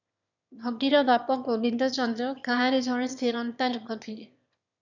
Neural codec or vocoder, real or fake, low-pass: autoencoder, 22.05 kHz, a latent of 192 numbers a frame, VITS, trained on one speaker; fake; 7.2 kHz